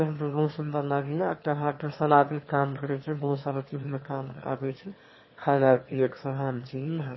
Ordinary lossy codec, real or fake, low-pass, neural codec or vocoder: MP3, 24 kbps; fake; 7.2 kHz; autoencoder, 22.05 kHz, a latent of 192 numbers a frame, VITS, trained on one speaker